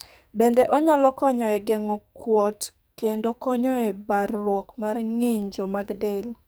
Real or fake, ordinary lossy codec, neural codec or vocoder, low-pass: fake; none; codec, 44.1 kHz, 2.6 kbps, SNAC; none